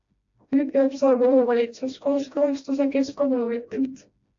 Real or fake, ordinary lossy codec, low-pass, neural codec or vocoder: fake; AAC, 48 kbps; 7.2 kHz; codec, 16 kHz, 1 kbps, FreqCodec, smaller model